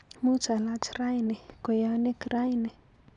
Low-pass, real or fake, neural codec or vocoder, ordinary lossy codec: 10.8 kHz; real; none; none